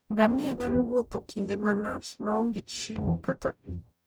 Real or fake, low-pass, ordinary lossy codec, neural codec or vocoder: fake; none; none; codec, 44.1 kHz, 0.9 kbps, DAC